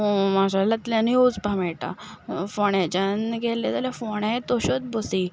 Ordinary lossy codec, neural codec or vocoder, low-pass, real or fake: none; none; none; real